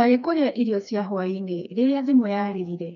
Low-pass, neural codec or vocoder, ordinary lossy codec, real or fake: 7.2 kHz; codec, 16 kHz, 2 kbps, FreqCodec, smaller model; none; fake